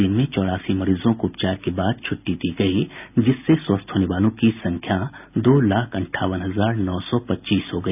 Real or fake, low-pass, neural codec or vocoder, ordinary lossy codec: real; 3.6 kHz; none; none